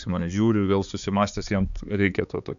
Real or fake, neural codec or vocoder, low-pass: fake; codec, 16 kHz, 4 kbps, X-Codec, HuBERT features, trained on balanced general audio; 7.2 kHz